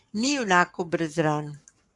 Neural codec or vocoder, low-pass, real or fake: codec, 44.1 kHz, 7.8 kbps, Pupu-Codec; 10.8 kHz; fake